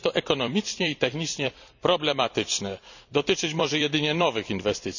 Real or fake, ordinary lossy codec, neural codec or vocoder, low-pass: fake; none; vocoder, 44.1 kHz, 128 mel bands every 256 samples, BigVGAN v2; 7.2 kHz